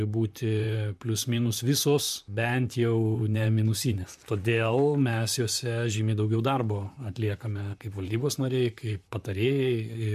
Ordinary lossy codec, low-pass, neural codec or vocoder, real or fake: MP3, 96 kbps; 14.4 kHz; vocoder, 44.1 kHz, 128 mel bands, Pupu-Vocoder; fake